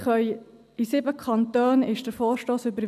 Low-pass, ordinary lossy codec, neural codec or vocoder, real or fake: 14.4 kHz; none; none; real